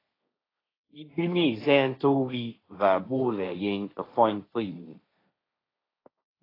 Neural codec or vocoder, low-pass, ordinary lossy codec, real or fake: codec, 16 kHz, 1.1 kbps, Voila-Tokenizer; 5.4 kHz; AAC, 24 kbps; fake